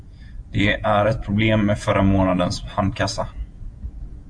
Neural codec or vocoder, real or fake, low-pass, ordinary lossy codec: vocoder, 48 kHz, 128 mel bands, Vocos; fake; 9.9 kHz; AAC, 64 kbps